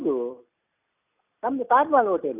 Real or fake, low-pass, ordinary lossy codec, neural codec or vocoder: real; 3.6 kHz; none; none